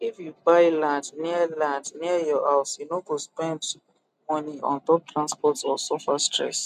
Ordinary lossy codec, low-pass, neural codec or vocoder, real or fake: none; 14.4 kHz; none; real